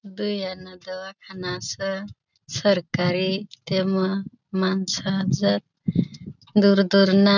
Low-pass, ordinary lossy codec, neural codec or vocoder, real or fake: 7.2 kHz; none; none; real